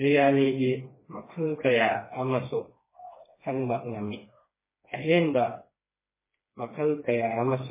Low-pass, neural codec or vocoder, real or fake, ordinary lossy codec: 3.6 kHz; codec, 16 kHz, 2 kbps, FreqCodec, smaller model; fake; MP3, 16 kbps